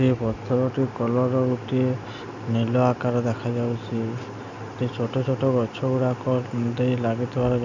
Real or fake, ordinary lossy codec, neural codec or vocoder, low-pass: real; none; none; 7.2 kHz